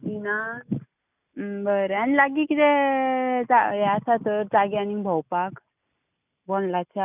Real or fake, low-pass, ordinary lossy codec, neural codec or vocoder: real; 3.6 kHz; none; none